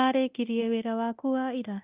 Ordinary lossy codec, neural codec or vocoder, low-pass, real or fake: Opus, 32 kbps; codec, 24 kHz, 0.9 kbps, DualCodec; 3.6 kHz; fake